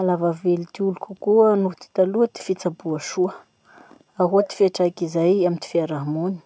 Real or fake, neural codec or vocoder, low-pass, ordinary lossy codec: real; none; none; none